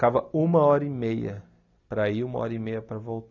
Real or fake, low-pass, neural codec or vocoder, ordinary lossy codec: real; 7.2 kHz; none; none